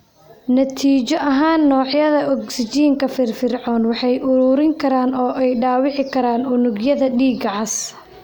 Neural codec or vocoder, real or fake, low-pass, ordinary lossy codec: none; real; none; none